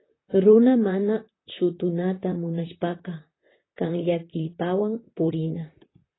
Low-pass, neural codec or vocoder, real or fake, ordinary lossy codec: 7.2 kHz; vocoder, 44.1 kHz, 128 mel bands, Pupu-Vocoder; fake; AAC, 16 kbps